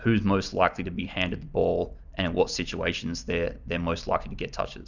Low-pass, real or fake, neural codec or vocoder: 7.2 kHz; fake; vocoder, 44.1 kHz, 128 mel bands every 256 samples, BigVGAN v2